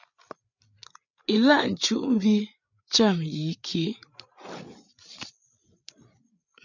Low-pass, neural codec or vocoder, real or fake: 7.2 kHz; codec, 16 kHz, 8 kbps, FreqCodec, larger model; fake